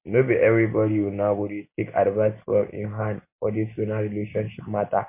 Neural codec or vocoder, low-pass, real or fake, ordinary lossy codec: none; 3.6 kHz; real; MP3, 24 kbps